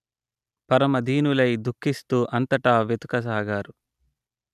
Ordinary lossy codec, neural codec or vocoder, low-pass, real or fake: none; none; 14.4 kHz; real